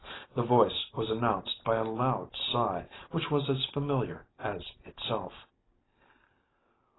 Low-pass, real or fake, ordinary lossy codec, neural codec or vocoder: 7.2 kHz; real; AAC, 16 kbps; none